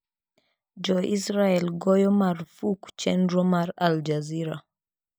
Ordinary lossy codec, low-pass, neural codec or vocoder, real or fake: none; none; none; real